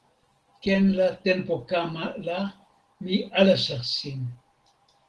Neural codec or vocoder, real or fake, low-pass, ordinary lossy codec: none; real; 10.8 kHz; Opus, 16 kbps